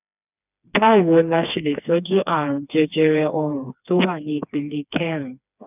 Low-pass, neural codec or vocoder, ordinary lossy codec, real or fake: 3.6 kHz; codec, 16 kHz, 2 kbps, FreqCodec, smaller model; none; fake